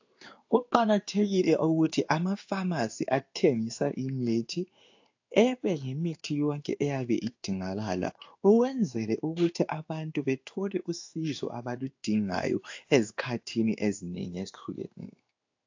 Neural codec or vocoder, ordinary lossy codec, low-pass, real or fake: codec, 16 kHz in and 24 kHz out, 1 kbps, XY-Tokenizer; AAC, 48 kbps; 7.2 kHz; fake